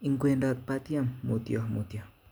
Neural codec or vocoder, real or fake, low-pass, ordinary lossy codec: none; real; none; none